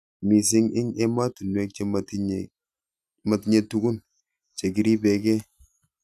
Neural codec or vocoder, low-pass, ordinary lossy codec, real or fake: none; 14.4 kHz; none; real